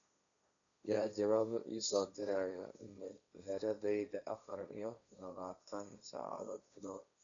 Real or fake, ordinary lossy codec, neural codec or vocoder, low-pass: fake; none; codec, 16 kHz, 1.1 kbps, Voila-Tokenizer; 7.2 kHz